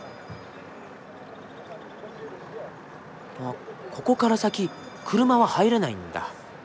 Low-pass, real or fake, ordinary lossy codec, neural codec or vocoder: none; real; none; none